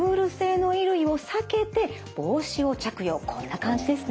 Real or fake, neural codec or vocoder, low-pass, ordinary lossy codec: real; none; none; none